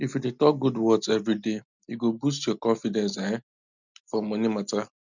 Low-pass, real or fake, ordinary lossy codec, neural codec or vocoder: 7.2 kHz; real; none; none